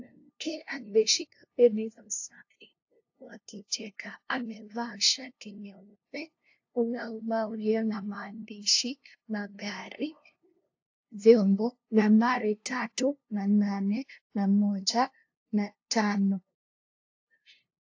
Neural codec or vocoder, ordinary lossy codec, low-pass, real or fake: codec, 16 kHz, 0.5 kbps, FunCodec, trained on LibriTTS, 25 frames a second; AAC, 48 kbps; 7.2 kHz; fake